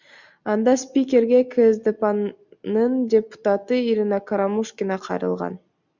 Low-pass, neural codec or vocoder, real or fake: 7.2 kHz; none; real